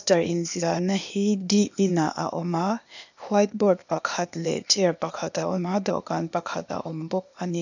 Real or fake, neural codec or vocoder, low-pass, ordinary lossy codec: fake; codec, 16 kHz, 0.8 kbps, ZipCodec; 7.2 kHz; none